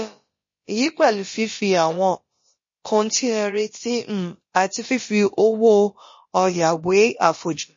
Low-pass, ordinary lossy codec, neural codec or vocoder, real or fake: 7.2 kHz; MP3, 32 kbps; codec, 16 kHz, about 1 kbps, DyCAST, with the encoder's durations; fake